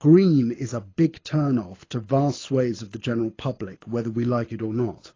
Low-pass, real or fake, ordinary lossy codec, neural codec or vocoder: 7.2 kHz; fake; AAC, 32 kbps; codec, 24 kHz, 6 kbps, HILCodec